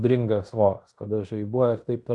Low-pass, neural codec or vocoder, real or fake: 10.8 kHz; codec, 16 kHz in and 24 kHz out, 0.9 kbps, LongCat-Audio-Codec, fine tuned four codebook decoder; fake